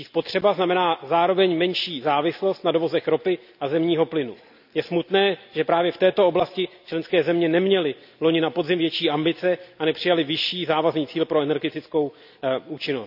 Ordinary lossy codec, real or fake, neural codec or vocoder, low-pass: none; real; none; 5.4 kHz